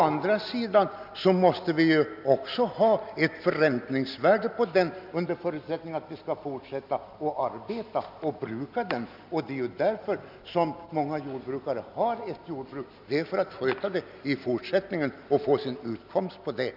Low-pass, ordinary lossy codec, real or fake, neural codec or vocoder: 5.4 kHz; none; real; none